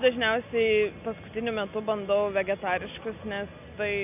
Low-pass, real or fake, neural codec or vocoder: 3.6 kHz; real; none